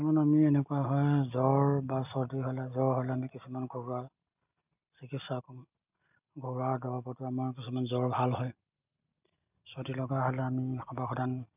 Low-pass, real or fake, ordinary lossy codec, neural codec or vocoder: 3.6 kHz; real; none; none